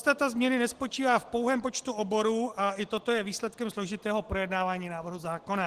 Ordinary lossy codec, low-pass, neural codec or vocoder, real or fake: Opus, 16 kbps; 14.4 kHz; none; real